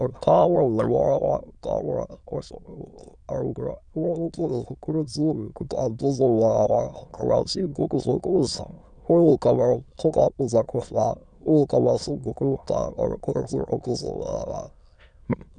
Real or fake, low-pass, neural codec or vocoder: fake; 9.9 kHz; autoencoder, 22.05 kHz, a latent of 192 numbers a frame, VITS, trained on many speakers